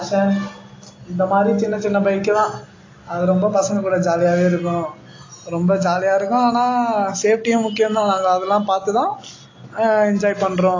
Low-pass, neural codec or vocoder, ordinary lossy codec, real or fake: 7.2 kHz; none; AAC, 32 kbps; real